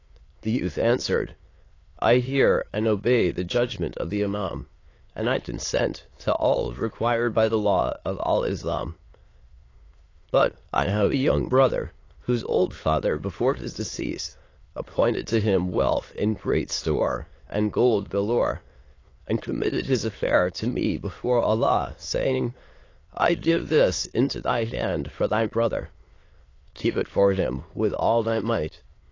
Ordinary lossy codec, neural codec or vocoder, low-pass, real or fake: AAC, 32 kbps; autoencoder, 22.05 kHz, a latent of 192 numbers a frame, VITS, trained on many speakers; 7.2 kHz; fake